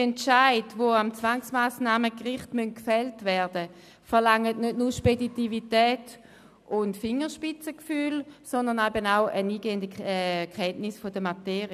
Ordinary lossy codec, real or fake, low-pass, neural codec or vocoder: none; real; 14.4 kHz; none